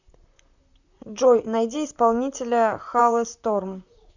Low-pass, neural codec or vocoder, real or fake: 7.2 kHz; vocoder, 44.1 kHz, 128 mel bands, Pupu-Vocoder; fake